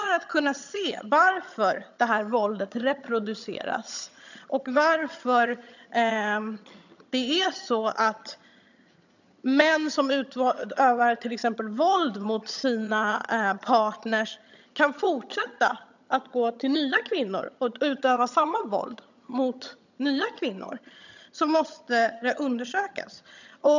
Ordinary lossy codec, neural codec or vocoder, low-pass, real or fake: none; vocoder, 22.05 kHz, 80 mel bands, HiFi-GAN; 7.2 kHz; fake